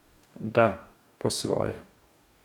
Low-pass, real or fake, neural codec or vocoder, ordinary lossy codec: 19.8 kHz; fake; codec, 44.1 kHz, 2.6 kbps, DAC; none